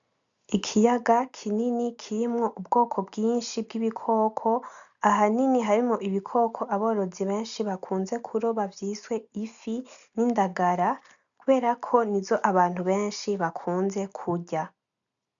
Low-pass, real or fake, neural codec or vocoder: 7.2 kHz; real; none